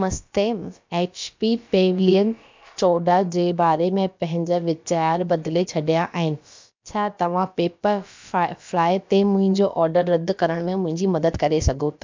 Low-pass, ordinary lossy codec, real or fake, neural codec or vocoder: 7.2 kHz; MP3, 64 kbps; fake; codec, 16 kHz, about 1 kbps, DyCAST, with the encoder's durations